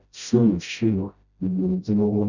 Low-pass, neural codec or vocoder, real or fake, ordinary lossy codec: 7.2 kHz; codec, 16 kHz, 0.5 kbps, FreqCodec, smaller model; fake; none